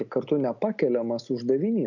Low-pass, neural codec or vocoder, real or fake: 7.2 kHz; none; real